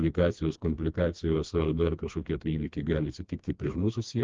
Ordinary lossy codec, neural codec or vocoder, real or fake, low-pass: Opus, 24 kbps; codec, 16 kHz, 2 kbps, FreqCodec, smaller model; fake; 7.2 kHz